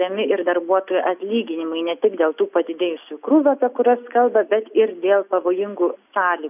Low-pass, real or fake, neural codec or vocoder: 3.6 kHz; real; none